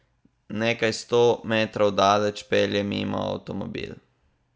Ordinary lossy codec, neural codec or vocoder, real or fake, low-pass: none; none; real; none